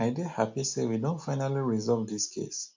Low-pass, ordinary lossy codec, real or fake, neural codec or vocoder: 7.2 kHz; MP3, 64 kbps; real; none